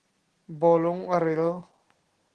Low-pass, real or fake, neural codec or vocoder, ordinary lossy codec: 10.8 kHz; real; none; Opus, 16 kbps